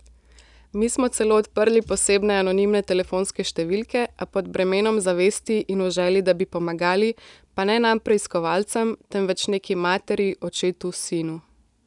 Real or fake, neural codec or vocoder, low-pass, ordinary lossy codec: real; none; 10.8 kHz; none